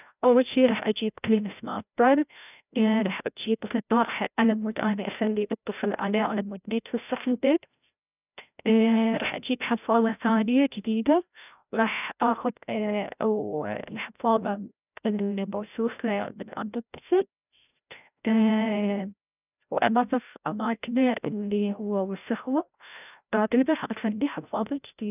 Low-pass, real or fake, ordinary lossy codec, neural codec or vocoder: 3.6 kHz; fake; none; codec, 16 kHz, 0.5 kbps, FreqCodec, larger model